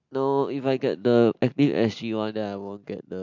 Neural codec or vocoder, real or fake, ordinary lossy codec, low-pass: none; real; MP3, 64 kbps; 7.2 kHz